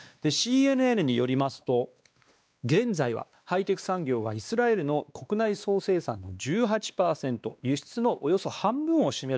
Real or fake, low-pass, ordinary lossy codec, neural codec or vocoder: fake; none; none; codec, 16 kHz, 2 kbps, X-Codec, WavLM features, trained on Multilingual LibriSpeech